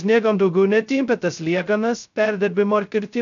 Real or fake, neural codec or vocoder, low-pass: fake; codec, 16 kHz, 0.2 kbps, FocalCodec; 7.2 kHz